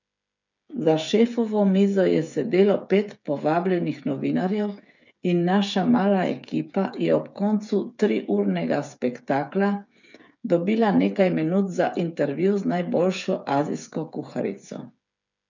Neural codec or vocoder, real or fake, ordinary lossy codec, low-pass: codec, 16 kHz, 8 kbps, FreqCodec, smaller model; fake; none; 7.2 kHz